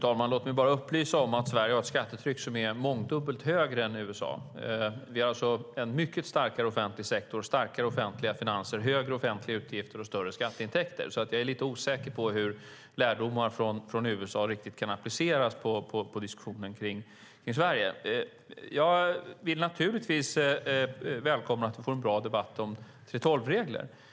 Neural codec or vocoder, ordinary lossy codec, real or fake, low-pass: none; none; real; none